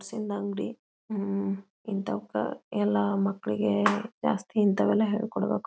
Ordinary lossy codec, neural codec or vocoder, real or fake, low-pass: none; none; real; none